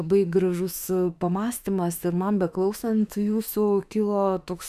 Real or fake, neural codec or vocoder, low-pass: fake; autoencoder, 48 kHz, 32 numbers a frame, DAC-VAE, trained on Japanese speech; 14.4 kHz